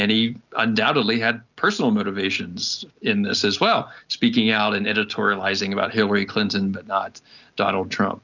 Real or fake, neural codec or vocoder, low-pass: real; none; 7.2 kHz